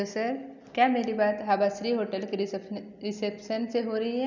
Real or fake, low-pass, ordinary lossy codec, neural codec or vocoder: real; 7.2 kHz; none; none